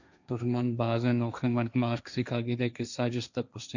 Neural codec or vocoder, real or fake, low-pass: codec, 16 kHz, 1.1 kbps, Voila-Tokenizer; fake; 7.2 kHz